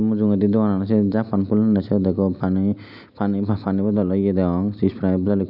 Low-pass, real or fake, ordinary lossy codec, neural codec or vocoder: 5.4 kHz; real; none; none